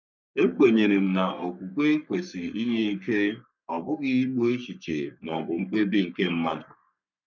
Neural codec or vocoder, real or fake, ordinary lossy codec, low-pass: codec, 44.1 kHz, 3.4 kbps, Pupu-Codec; fake; none; 7.2 kHz